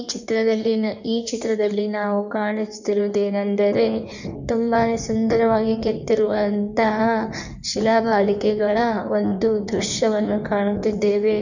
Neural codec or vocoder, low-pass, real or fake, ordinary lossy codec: codec, 16 kHz in and 24 kHz out, 1.1 kbps, FireRedTTS-2 codec; 7.2 kHz; fake; none